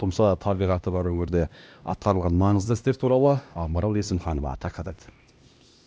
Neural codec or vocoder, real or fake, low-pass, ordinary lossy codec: codec, 16 kHz, 1 kbps, X-Codec, HuBERT features, trained on LibriSpeech; fake; none; none